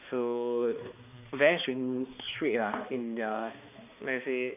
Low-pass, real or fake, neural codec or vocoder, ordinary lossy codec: 3.6 kHz; fake; codec, 16 kHz, 2 kbps, X-Codec, HuBERT features, trained on balanced general audio; none